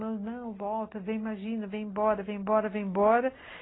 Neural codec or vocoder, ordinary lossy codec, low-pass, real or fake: none; AAC, 16 kbps; 7.2 kHz; real